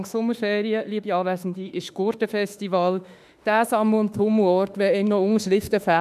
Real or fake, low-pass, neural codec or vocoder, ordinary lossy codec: fake; 14.4 kHz; autoencoder, 48 kHz, 32 numbers a frame, DAC-VAE, trained on Japanese speech; none